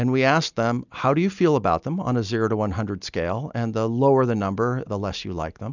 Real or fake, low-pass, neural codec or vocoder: real; 7.2 kHz; none